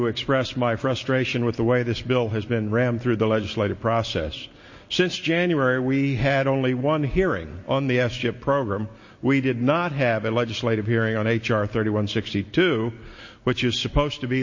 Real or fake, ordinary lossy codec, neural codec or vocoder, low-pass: real; MP3, 32 kbps; none; 7.2 kHz